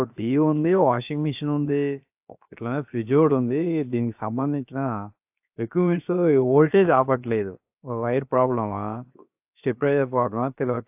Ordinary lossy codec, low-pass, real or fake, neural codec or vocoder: AAC, 32 kbps; 3.6 kHz; fake; codec, 16 kHz, 0.7 kbps, FocalCodec